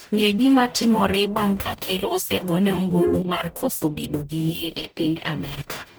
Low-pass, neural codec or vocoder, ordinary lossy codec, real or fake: none; codec, 44.1 kHz, 0.9 kbps, DAC; none; fake